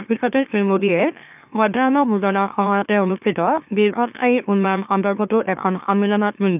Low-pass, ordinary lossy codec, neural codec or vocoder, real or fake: 3.6 kHz; none; autoencoder, 44.1 kHz, a latent of 192 numbers a frame, MeloTTS; fake